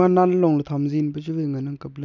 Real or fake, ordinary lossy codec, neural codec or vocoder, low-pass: real; none; none; 7.2 kHz